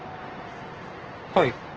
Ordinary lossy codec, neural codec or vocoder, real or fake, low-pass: Opus, 16 kbps; none; real; 7.2 kHz